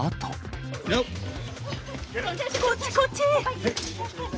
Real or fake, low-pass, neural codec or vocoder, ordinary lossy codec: real; none; none; none